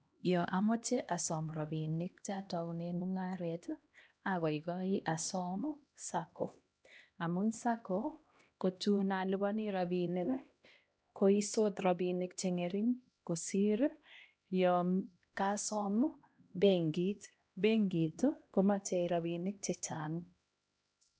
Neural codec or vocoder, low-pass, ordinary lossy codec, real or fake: codec, 16 kHz, 1 kbps, X-Codec, HuBERT features, trained on LibriSpeech; none; none; fake